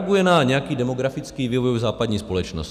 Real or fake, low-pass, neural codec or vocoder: real; 14.4 kHz; none